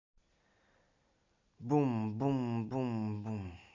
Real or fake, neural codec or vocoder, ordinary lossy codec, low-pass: real; none; MP3, 64 kbps; 7.2 kHz